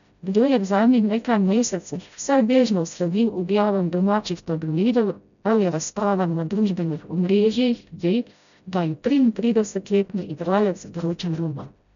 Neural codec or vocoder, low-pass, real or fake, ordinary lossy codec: codec, 16 kHz, 0.5 kbps, FreqCodec, smaller model; 7.2 kHz; fake; none